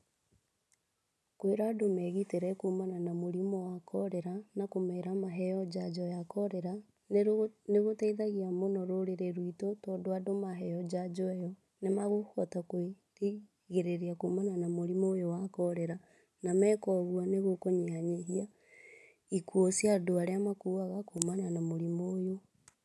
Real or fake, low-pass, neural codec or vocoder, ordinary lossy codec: real; none; none; none